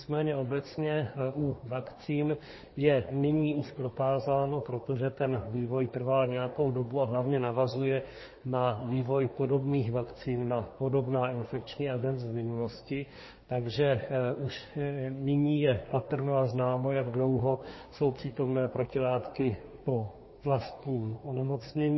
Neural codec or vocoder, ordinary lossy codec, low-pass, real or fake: codec, 24 kHz, 1 kbps, SNAC; MP3, 24 kbps; 7.2 kHz; fake